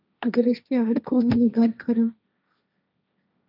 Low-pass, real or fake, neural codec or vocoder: 5.4 kHz; fake; codec, 16 kHz, 1.1 kbps, Voila-Tokenizer